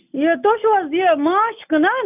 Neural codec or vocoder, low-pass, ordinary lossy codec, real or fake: none; 3.6 kHz; none; real